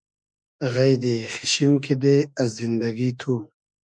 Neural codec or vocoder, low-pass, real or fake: autoencoder, 48 kHz, 32 numbers a frame, DAC-VAE, trained on Japanese speech; 9.9 kHz; fake